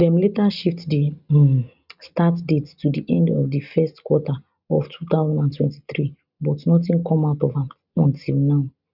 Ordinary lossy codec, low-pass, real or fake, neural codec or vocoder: none; 5.4 kHz; real; none